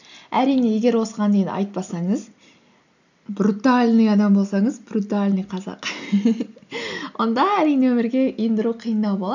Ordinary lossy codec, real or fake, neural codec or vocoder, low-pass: none; real; none; 7.2 kHz